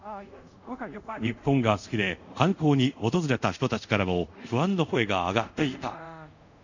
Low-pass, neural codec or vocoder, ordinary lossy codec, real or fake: 7.2 kHz; codec, 24 kHz, 0.5 kbps, DualCodec; none; fake